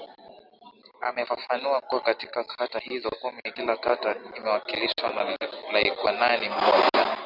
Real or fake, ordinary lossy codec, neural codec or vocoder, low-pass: real; Opus, 64 kbps; none; 5.4 kHz